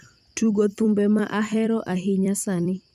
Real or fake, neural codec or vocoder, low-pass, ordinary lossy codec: fake; vocoder, 44.1 kHz, 128 mel bands every 256 samples, BigVGAN v2; 14.4 kHz; none